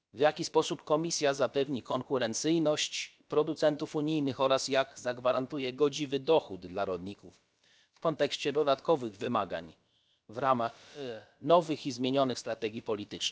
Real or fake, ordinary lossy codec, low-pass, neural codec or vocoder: fake; none; none; codec, 16 kHz, about 1 kbps, DyCAST, with the encoder's durations